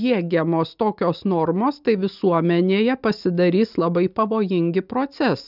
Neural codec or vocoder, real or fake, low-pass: none; real; 5.4 kHz